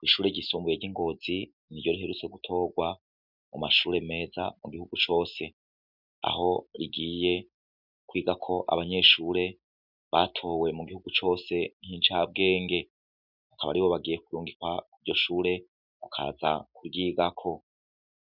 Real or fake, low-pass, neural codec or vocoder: real; 5.4 kHz; none